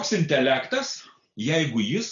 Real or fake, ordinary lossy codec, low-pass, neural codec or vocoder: real; MP3, 96 kbps; 7.2 kHz; none